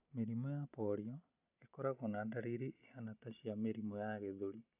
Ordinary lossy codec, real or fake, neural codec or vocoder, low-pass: none; real; none; 3.6 kHz